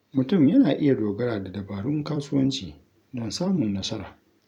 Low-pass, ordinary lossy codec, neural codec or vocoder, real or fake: 19.8 kHz; none; vocoder, 44.1 kHz, 128 mel bands every 256 samples, BigVGAN v2; fake